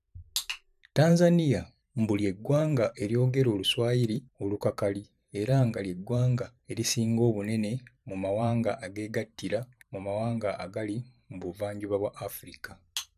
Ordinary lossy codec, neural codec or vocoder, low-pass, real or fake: none; vocoder, 44.1 kHz, 128 mel bands every 512 samples, BigVGAN v2; 14.4 kHz; fake